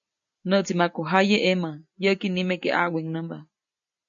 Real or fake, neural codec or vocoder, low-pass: real; none; 7.2 kHz